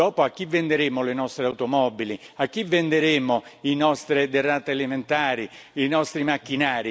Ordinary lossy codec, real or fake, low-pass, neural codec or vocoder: none; real; none; none